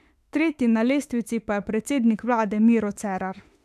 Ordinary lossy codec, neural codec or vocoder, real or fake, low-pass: none; autoencoder, 48 kHz, 32 numbers a frame, DAC-VAE, trained on Japanese speech; fake; 14.4 kHz